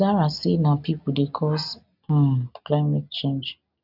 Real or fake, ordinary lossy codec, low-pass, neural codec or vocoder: real; none; 5.4 kHz; none